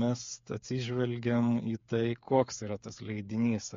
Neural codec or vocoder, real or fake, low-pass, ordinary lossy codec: codec, 16 kHz, 8 kbps, FreqCodec, smaller model; fake; 7.2 kHz; MP3, 48 kbps